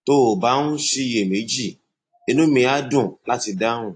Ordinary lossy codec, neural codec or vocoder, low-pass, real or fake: AAC, 48 kbps; none; 9.9 kHz; real